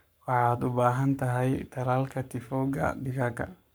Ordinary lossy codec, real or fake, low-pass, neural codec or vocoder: none; fake; none; codec, 44.1 kHz, 7.8 kbps, Pupu-Codec